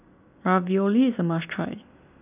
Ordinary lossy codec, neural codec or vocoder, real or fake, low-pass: none; none; real; 3.6 kHz